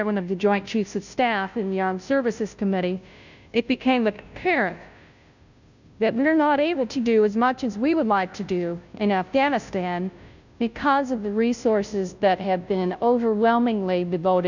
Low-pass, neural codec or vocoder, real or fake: 7.2 kHz; codec, 16 kHz, 0.5 kbps, FunCodec, trained on Chinese and English, 25 frames a second; fake